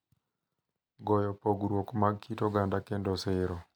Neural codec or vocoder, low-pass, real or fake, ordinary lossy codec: none; 19.8 kHz; real; none